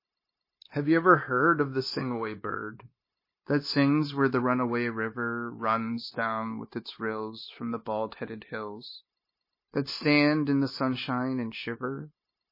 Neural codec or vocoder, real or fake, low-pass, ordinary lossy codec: codec, 16 kHz, 0.9 kbps, LongCat-Audio-Codec; fake; 5.4 kHz; MP3, 24 kbps